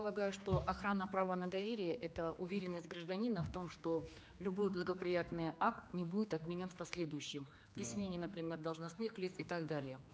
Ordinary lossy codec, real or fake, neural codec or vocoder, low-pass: none; fake; codec, 16 kHz, 2 kbps, X-Codec, HuBERT features, trained on general audio; none